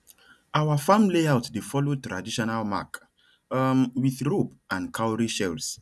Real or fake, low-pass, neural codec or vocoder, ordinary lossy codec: real; none; none; none